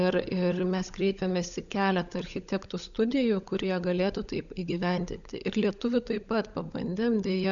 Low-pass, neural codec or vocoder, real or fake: 7.2 kHz; codec, 16 kHz, 16 kbps, FunCodec, trained on LibriTTS, 50 frames a second; fake